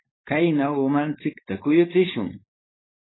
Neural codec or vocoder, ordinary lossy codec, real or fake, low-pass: codec, 16 kHz, 4.8 kbps, FACodec; AAC, 16 kbps; fake; 7.2 kHz